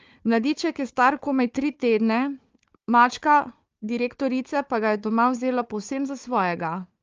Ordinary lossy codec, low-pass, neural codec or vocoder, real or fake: Opus, 24 kbps; 7.2 kHz; codec, 16 kHz, 4 kbps, FunCodec, trained on Chinese and English, 50 frames a second; fake